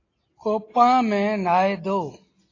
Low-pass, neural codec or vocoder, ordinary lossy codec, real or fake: 7.2 kHz; none; AAC, 32 kbps; real